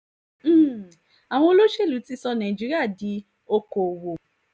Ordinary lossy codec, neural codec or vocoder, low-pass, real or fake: none; none; none; real